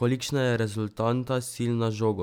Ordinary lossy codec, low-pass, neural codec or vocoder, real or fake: none; 19.8 kHz; none; real